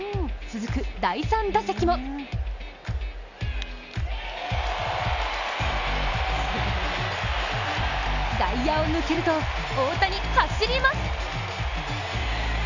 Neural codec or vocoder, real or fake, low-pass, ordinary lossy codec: none; real; 7.2 kHz; none